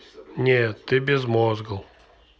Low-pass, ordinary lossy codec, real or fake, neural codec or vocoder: none; none; real; none